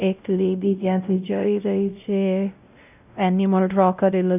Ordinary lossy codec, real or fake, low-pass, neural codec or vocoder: none; fake; 3.6 kHz; codec, 16 kHz, 0.5 kbps, X-Codec, WavLM features, trained on Multilingual LibriSpeech